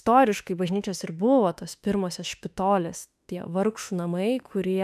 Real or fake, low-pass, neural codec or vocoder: fake; 14.4 kHz; autoencoder, 48 kHz, 32 numbers a frame, DAC-VAE, trained on Japanese speech